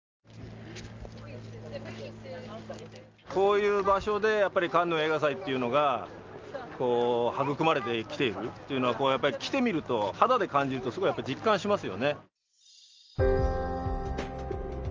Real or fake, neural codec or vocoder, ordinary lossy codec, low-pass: real; none; Opus, 24 kbps; 7.2 kHz